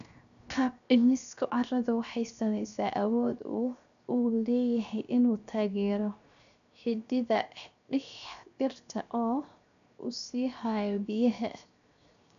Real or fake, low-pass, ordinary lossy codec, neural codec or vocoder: fake; 7.2 kHz; none; codec, 16 kHz, 0.7 kbps, FocalCodec